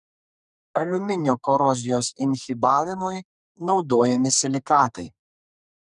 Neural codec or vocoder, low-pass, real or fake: codec, 44.1 kHz, 2.6 kbps, SNAC; 10.8 kHz; fake